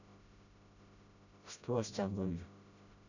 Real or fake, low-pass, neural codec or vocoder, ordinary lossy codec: fake; 7.2 kHz; codec, 16 kHz, 0.5 kbps, FreqCodec, smaller model; none